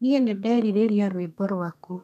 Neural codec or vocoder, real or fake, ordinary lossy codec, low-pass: codec, 32 kHz, 1.9 kbps, SNAC; fake; none; 14.4 kHz